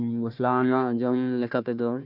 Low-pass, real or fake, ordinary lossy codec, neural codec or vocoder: 5.4 kHz; fake; none; codec, 16 kHz, 1 kbps, FunCodec, trained on Chinese and English, 50 frames a second